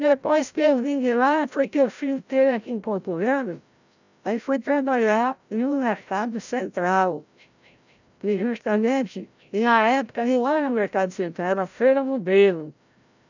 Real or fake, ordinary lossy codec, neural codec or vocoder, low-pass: fake; none; codec, 16 kHz, 0.5 kbps, FreqCodec, larger model; 7.2 kHz